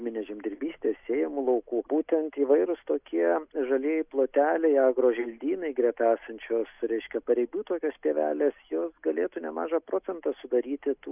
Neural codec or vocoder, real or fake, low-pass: none; real; 3.6 kHz